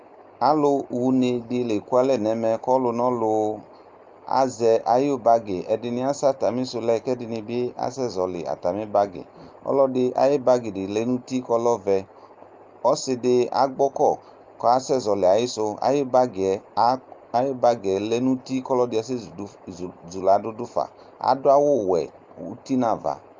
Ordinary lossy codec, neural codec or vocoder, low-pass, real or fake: Opus, 24 kbps; none; 7.2 kHz; real